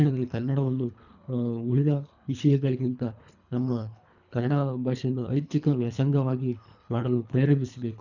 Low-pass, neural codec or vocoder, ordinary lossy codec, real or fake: 7.2 kHz; codec, 24 kHz, 3 kbps, HILCodec; none; fake